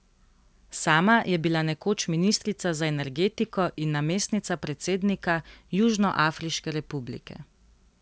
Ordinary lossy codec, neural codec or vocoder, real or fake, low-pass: none; none; real; none